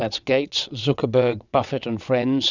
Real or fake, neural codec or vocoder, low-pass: fake; vocoder, 22.05 kHz, 80 mel bands, WaveNeXt; 7.2 kHz